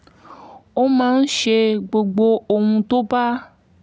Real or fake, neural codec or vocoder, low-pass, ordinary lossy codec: real; none; none; none